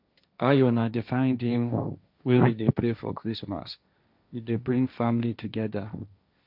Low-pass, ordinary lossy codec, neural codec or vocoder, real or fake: 5.4 kHz; none; codec, 16 kHz, 1.1 kbps, Voila-Tokenizer; fake